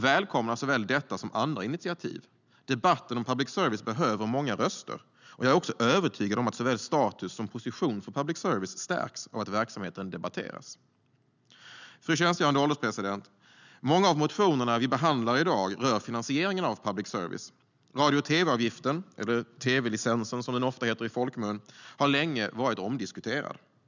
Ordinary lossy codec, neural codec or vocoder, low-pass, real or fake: none; none; 7.2 kHz; real